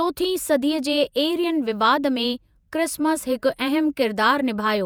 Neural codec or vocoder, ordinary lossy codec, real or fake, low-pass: vocoder, 48 kHz, 128 mel bands, Vocos; none; fake; none